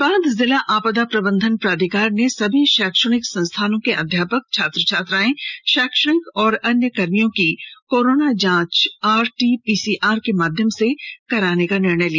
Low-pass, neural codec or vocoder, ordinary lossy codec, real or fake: 7.2 kHz; none; none; real